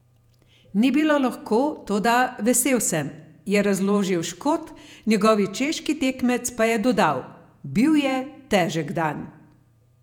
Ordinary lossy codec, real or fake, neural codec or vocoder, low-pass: none; fake; vocoder, 48 kHz, 128 mel bands, Vocos; 19.8 kHz